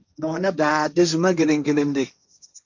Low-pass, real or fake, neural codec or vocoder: 7.2 kHz; fake; codec, 16 kHz, 1.1 kbps, Voila-Tokenizer